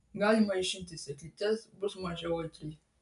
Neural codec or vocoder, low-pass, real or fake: none; 10.8 kHz; real